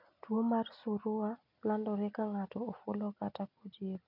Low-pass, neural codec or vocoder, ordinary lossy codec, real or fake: 5.4 kHz; none; none; real